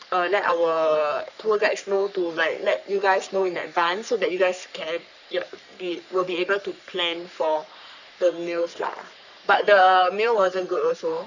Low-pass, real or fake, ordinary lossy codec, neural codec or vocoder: 7.2 kHz; fake; none; codec, 44.1 kHz, 3.4 kbps, Pupu-Codec